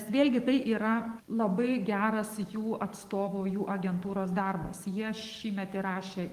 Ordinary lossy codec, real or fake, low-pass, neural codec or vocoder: Opus, 24 kbps; fake; 14.4 kHz; codec, 44.1 kHz, 7.8 kbps, DAC